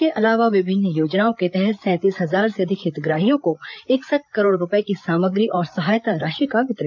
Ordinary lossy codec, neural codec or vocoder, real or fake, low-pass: none; codec, 16 kHz, 8 kbps, FreqCodec, larger model; fake; 7.2 kHz